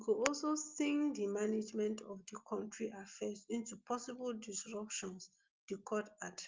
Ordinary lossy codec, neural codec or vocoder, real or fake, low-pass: Opus, 32 kbps; none; real; 7.2 kHz